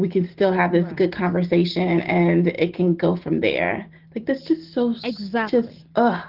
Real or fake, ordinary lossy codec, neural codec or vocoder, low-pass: real; Opus, 16 kbps; none; 5.4 kHz